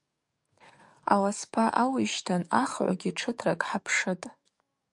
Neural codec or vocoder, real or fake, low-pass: codec, 44.1 kHz, 7.8 kbps, DAC; fake; 10.8 kHz